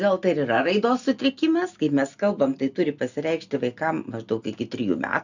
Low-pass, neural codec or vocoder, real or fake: 7.2 kHz; none; real